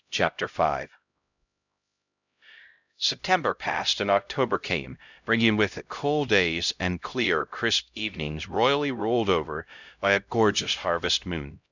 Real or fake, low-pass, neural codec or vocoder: fake; 7.2 kHz; codec, 16 kHz, 0.5 kbps, X-Codec, HuBERT features, trained on LibriSpeech